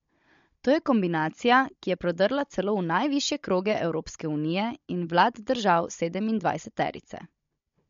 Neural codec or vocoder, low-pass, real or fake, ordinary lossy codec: codec, 16 kHz, 16 kbps, FunCodec, trained on Chinese and English, 50 frames a second; 7.2 kHz; fake; MP3, 48 kbps